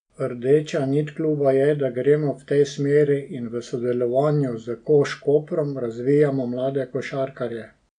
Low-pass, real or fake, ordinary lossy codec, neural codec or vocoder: 9.9 kHz; real; none; none